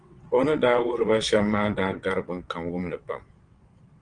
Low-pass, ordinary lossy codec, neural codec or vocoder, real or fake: 9.9 kHz; Opus, 24 kbps; vocoder, 22.05 kHz, 80 mel bands, WaveNeXt; fake